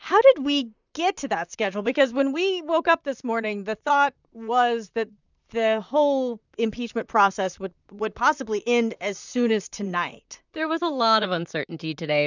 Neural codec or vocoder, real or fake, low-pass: vocoder, 44.1 kHz, 128 mel bands, Pupu-Vocoder; fake; 7.2 kHz